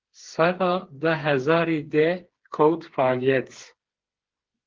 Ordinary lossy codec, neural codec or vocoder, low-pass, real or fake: Opus, 16 kbps; codec, 16 kHz, 4 kbps, FreqCodec, smaller model; 7.2 kHz; fake